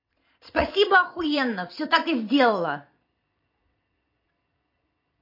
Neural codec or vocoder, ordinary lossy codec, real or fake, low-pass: vocoder, 22.05 kHz, 80 mel bands, Vocos; MP3, 32 kbps; fake; 5.4 kHz